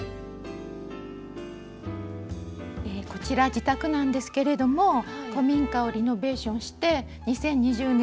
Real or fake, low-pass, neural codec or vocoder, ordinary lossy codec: real; none; none; none